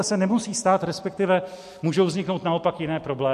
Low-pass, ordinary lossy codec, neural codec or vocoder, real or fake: 14.4 kHz; MP3, 64 kbps; none; real